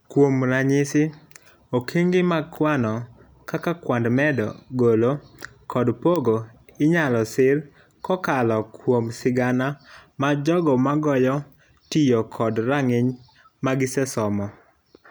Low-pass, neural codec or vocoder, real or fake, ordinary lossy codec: none; none; real; none